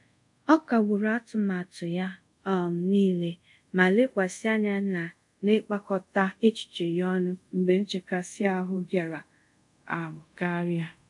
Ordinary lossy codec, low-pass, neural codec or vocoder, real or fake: none; 10.8 kHz; codec, 24 kHz, 0.5 kbps, DualCodec; fake